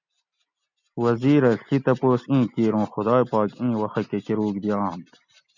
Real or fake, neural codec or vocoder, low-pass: real; none; 7.2 kHz